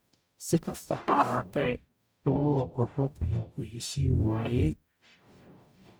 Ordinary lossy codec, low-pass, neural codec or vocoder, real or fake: none; none; codec, 44.1 kHz, 0.9 kbps, DAC; fake